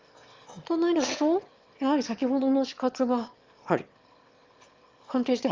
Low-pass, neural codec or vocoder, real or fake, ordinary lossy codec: 7.2 kHz; autoencoder, 22.05 kHz, a latent of 192 numbers a frame, VITS, trained on one speaker; fake; Opus, 32 kbps